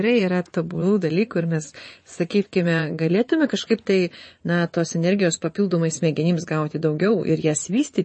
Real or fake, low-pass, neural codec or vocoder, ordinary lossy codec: fake; 10.8 kHz; vocoder, 24 kHz, 100 mel bands, Vocos; MP3, 32 kbps